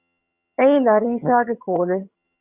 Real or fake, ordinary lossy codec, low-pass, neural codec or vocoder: fake; Opus, 64 kbps; 3.6 kHz; vocoder, 22.05 kHz, 80 mel bands, HiFi-GAN